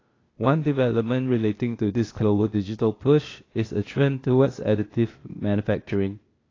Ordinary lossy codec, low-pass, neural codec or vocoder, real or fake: AAC, 32 kbps; 7.2 kHz; codec, 16 kHz, 0.8 kbps, ZipCodec; fake